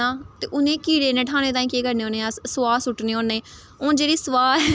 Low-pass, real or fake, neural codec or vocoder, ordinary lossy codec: none; real; none; none